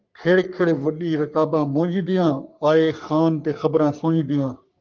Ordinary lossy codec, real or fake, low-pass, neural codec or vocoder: Opus, 24 kbps; fake; 7.2 kHz; codec, 44.1 kHz, 3.4 kbps, Pupu-Codec